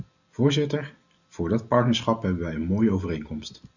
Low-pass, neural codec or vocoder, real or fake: 7.2 kHz; none; real